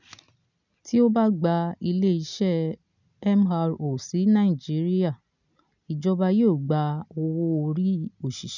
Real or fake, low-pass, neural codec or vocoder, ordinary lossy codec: real; 7.2 kHz; none; none